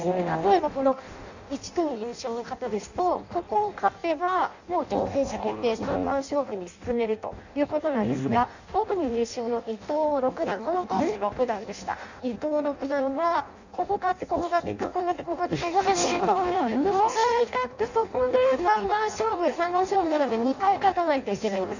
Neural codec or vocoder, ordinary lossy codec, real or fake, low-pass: codec, 16 kHz in and 24 kHz out, 0.6 kbps, FireRedTTS-2 codec; none; fake; 7.2 kHz